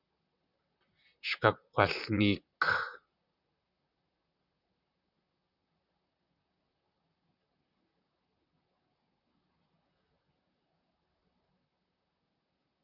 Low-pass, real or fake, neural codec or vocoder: 5.4 kHz; fake; vocoder, 44.1 kHz, 128 mel bands, Pupu-Vocoder